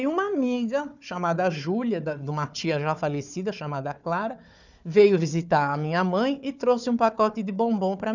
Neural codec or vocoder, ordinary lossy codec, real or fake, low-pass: codec, 16 kHz, 4 kbps, FunCodec, trained on Chinese and English, 50 frames a second; none; fake; 7.2 kHz